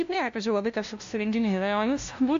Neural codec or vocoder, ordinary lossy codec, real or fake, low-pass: codec, 16 kHz, 0.5 kbps, FunCodec, trained on LibriTTS, 25 frames a second; AAC, 48 kbps; fake; 7.2 kHz